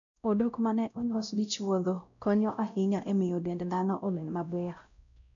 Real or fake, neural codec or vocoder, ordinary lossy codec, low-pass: fake; codec, 16 kHz, 0.5 kbps, X-Codec, WavLM features, trained on Multilingual LibriSpeech; MP3, 96 kbps; 7.2 kHz